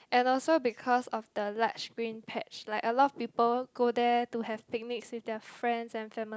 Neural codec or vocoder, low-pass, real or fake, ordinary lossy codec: none; none; real; none